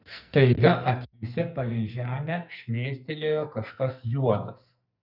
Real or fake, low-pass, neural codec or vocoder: fake; 5.4 kHz; codec, 44.1 kHz, 2.6 kbps, SNAC